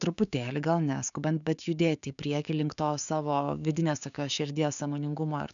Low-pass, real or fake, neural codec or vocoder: 7.2 kHz; fake; codec, 16 kHz, 6 kbps, DAC